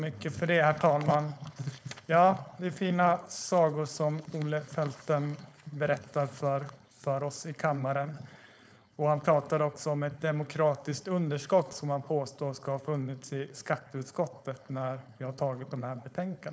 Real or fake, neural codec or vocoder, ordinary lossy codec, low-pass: fake; codec, 16 kHz, 4.8 kbps, FACodec; none; none